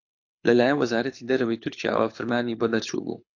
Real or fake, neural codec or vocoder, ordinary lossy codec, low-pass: fake; codec, 24 kHz, 6 kbps, HILCodec; AAC, 48 kbps; 7.2 kHz